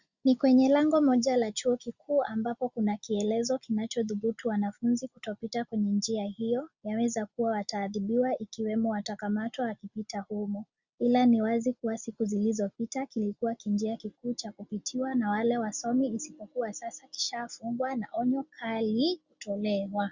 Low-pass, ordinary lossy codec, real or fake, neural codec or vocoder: 7.2 kHz; Opus, 64 kbps; real; none